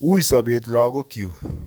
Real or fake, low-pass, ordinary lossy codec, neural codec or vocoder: fake; none; none; codec, 44.1 kHz, 2.6 kbps, SNAC